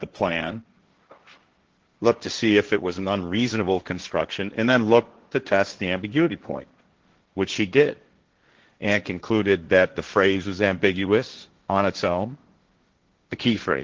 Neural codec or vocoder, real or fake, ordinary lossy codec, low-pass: codec, 16 kHz, 1.1 kbps, Voila-Tokenizer; fake; Opus, 16 kbps; 7.2 kHz